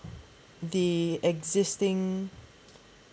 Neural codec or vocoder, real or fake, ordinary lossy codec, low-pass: none; real; none; none